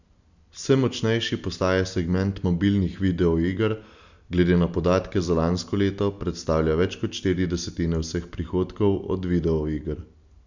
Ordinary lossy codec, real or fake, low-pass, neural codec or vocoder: none; real; 7.2 kHz; none